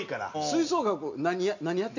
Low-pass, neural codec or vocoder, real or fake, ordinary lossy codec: 7.2 kHz; none; real; none